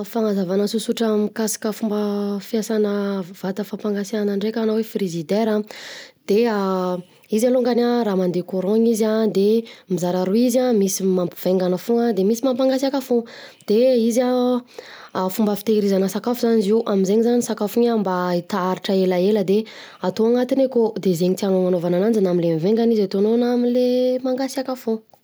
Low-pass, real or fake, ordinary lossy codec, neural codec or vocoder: none; real; none; none